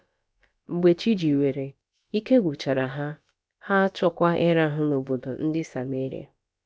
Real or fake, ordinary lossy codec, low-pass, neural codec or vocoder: fake; none; none; codec, 16 kHz, about 1 kbps, DyCAST, with the encoder's durations